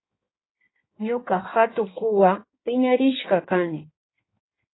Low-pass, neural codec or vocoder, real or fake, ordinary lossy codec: 7.2 kHz; codec, 16 kHz in and 24 kHz out, 1.1 kbps, FireRedTTS-2 codec; fake; AAC, 16 kbps